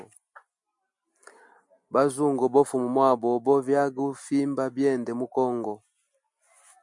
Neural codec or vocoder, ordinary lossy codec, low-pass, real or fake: none; MP3, 64 kbps; 10.8 kHz; real